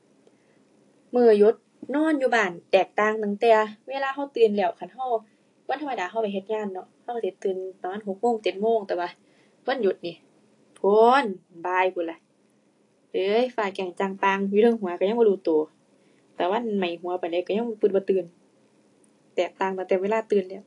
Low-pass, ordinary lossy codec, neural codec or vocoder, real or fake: 10.8 kHz; AAC, 48 kbps; none; real